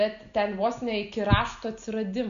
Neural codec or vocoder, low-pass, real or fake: none; 7.2 kHz; real